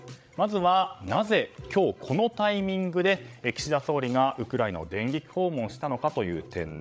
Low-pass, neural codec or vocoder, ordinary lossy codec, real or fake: none; codec, 16 kHz, 16 kbps, FreqCodec, larger model; none; fake